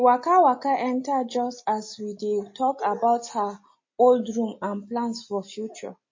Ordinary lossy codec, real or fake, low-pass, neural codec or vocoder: MP3, 32 kbps; real; 7.2 kHz; none